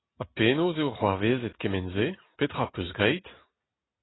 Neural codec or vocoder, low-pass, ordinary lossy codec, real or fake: none; 7.2 kHz; AAC, 16 kbps; real